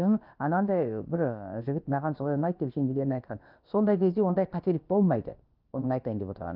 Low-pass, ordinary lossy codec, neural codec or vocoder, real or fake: 5.4 kHz; Opus, 32 kbps; codec, 16 kHz, about 1 kbps, DyCAST, with the encoder's durations; fake